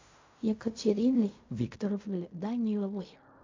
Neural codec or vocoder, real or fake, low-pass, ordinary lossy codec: codec, 16 kHz in and 24 kHz out, 0.4 kbps, LongCat-Audio-Codec, fine tuned four codebook decoder; fake; 7.2 kHz; MP3, 48 kbps